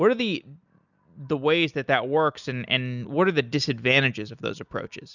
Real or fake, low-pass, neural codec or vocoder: real; 7.2 kHz; none